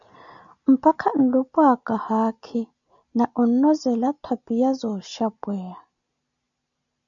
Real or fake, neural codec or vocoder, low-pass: real; none; 7.2 kHz